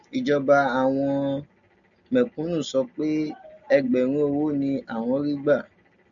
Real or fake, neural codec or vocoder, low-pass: real; none; 7.2 kHz